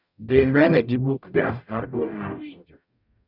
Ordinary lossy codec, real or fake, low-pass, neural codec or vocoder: none; fake; 5.4 kHz; codec, 44.1 kHz, 0.9 kbps, DAC